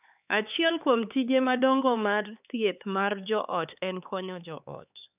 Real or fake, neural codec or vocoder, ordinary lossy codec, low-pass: fake; codec, 16 kHz, 4 kbps, X-Codec, HuBERT features, trained on LibriSpeech; none; 3.6 kHz